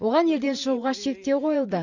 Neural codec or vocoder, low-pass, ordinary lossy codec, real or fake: vocoder, 44.1 kHz, 128 mel bands every 512 samples, BigVGAN v2; 7.2 kHz; none; fake